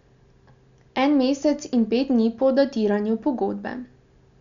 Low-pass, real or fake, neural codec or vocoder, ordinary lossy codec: 7.2 kHz; real; none; none